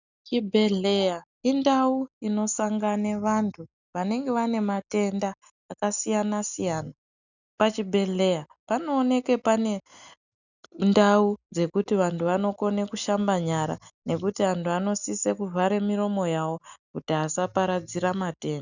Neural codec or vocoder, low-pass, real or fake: none; 7.2 kHz; real